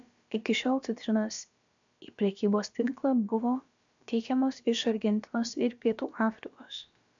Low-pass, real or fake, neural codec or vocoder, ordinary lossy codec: 7.2 kHz; fake; codec, 16 kHz, about 1 kbps, DyCAST, with the encoder's durations; MP3, 64 kbps